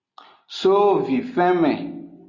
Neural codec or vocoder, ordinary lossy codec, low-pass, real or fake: none; Opus, 64 kbps; 7.2 kHz; real